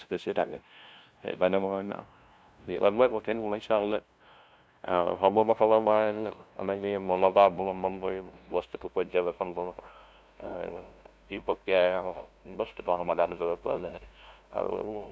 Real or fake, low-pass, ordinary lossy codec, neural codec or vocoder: fake; none; none; codec, 16 kHz, 0.5 kbps, FunCodec, trained on LibriTTS, 25 frames a second